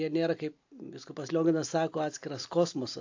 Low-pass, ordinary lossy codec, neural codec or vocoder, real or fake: 7.2 kHz; AAC, 48 kbps; none; real